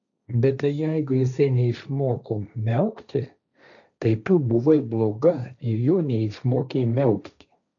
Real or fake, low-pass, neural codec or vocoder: fake; 7.2 kHz; codec, 16 kHz, 1.1 kbps, Voila-Tokenizer